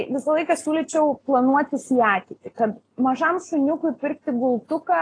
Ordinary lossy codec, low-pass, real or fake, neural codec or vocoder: AAC, 32 kbps; 9.9 kHz; real; none